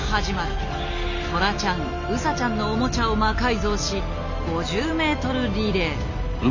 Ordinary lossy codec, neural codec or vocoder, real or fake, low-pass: none; none; real; 7.2 kHz